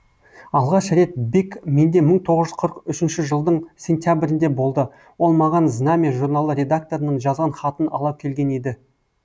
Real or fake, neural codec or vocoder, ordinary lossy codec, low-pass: real; none; none; none